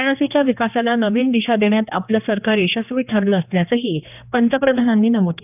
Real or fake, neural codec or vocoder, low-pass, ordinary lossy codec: fake; codec, 16 kHz, 2 kbps, X-Codec, HuBERT features, trained on general audio; 3.6 kHz; none